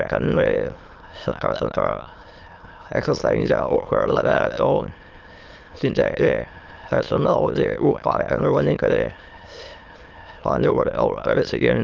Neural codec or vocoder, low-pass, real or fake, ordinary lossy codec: autoencoder, 22.05 kHz, a latent of 192 numbers a frame, VITS, trained on many speakers; 7.2 kHz; fake; Opus, 32 kbps